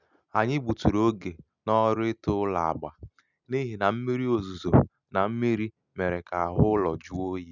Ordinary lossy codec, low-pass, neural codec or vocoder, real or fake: none; 7.2 kHz; none; real